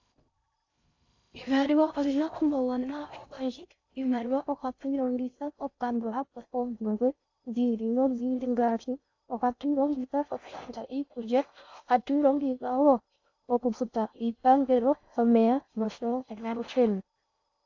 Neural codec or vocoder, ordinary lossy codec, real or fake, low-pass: codec, 16 kHz in and 24 kHz out, 0.6 kbps, FocalCodec, streaming, 2048 codes; AAC, 48 kbps; fake; 7.2 kHz